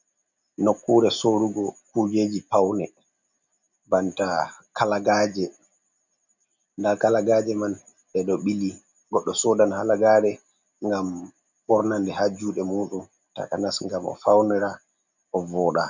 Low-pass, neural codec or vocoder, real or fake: 7.2 kHz; none; real